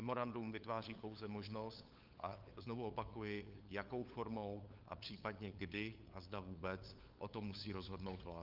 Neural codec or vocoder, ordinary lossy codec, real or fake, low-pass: codec, 16 kHz, 8 kbps, FunCodec, trained on LibriTTS, 25 frames a second; Opus, 24 kbps; fake; 5.4 kHz